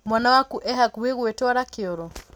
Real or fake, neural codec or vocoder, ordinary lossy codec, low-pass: real; none; none; none